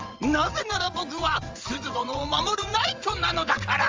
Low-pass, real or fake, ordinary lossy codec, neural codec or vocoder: 7.2 kHz; fake; Opus, 32 kbps; vocoder, 22.05 kHz, 80 mel bands, WaveNeXt